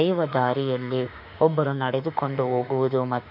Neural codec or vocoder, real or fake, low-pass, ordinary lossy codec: autoencoder, 48 kHz, 32 numbers a frame, DAC-VAE, trained on Japanese speech; fake; 5.4 kHz; none